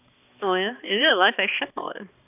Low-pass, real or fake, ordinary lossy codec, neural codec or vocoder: 3.6 kHz; fake; none; codec, 16 kHz, 2 kbps, X-Codec, HuBERT features, trained on balanced general audio